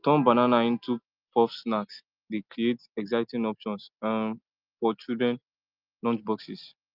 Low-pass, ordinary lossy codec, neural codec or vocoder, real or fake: 5.4 kHz; Opus, 32 kbps; none; real